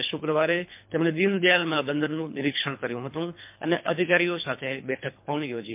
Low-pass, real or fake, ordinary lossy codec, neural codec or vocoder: 3.6 kHz; fake; MP3, 32 kbps; codec, 24 kHz, 3 kbps, HILCodec